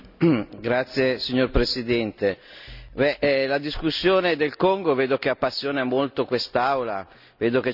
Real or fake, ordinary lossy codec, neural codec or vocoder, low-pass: real; none; none; 5.4 kHz